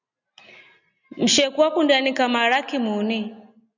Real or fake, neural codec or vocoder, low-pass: real; none; 7.2 kHz